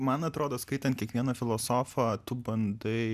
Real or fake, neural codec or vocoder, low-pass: real; none; 14.4 kHz